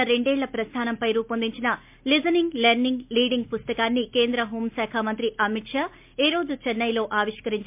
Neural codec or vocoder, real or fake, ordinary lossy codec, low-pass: none; real; MP3, 32 kbps; 3.6 kHz